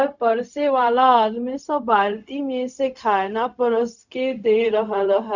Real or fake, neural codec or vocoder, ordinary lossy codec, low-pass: fake; codec, 16 kHz, 0.4 kbps, LongCat-Audio-Codec; none; 7.2 kHz